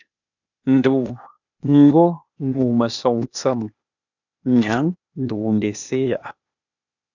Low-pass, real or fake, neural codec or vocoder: 7.2 kHz; fake; codec, 16 kHz, 0.8 kbps, ZipCodec